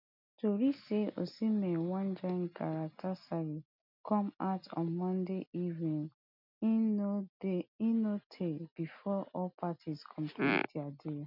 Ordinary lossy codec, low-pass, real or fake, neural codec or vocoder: none; 5.4 kHz; real; none